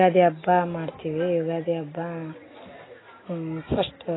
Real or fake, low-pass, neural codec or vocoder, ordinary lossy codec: real; 7.2 kHz; none; AAC, 16 kbps